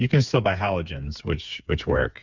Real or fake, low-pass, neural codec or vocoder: fake; 7.2 kHz; codec, 16 kHz, 4 kbps, FreqCodec, smaller model